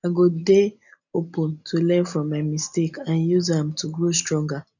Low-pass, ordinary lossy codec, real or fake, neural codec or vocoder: 7.2 kHz; none; real; none